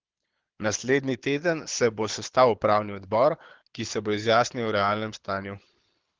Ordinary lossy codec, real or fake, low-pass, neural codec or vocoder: Opus, 16 kbps; fake; 7.2 kHz; codec, 24 kHz, 6 kbps, HILCodec